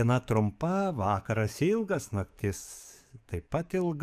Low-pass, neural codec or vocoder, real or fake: 14.4 kHz; codec, 44.1 kHz, 7.8 kbps, DAC; fake